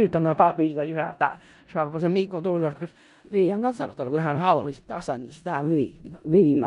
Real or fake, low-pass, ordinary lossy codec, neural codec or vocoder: fake; 10.8 kHz; none; codec, 16 kHz in and 24 kHz out, 0.4 kbps, LongCat-Audio-Codec, four codebook decoder